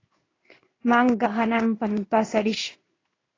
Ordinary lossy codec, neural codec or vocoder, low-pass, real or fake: AAC, 32 kbps; codec, 16 kHz in and 24 kHz out, 1 kbps, XY-Tokenizer; 7.2 kHz; fake